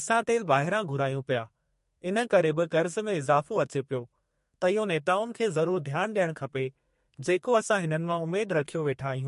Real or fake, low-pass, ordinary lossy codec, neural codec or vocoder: fake; 14.4 kHz; MP3, 48 kbps; codec, 32 kHz, 1.9 kbps, SNAC